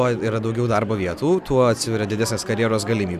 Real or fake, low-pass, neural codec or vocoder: real; 14.4 kHz; none